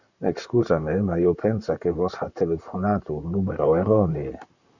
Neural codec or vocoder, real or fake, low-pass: vocoder, 44.1 kHz, 128 mel bands, Pupu-Vocoder; fake; 7.2 kHz